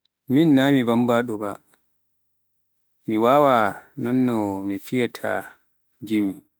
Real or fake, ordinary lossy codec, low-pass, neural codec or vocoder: fake; none; none; autoencoder, 48 kHz, 32 numbers a frame, DAC-VAE, trained on Japanese speech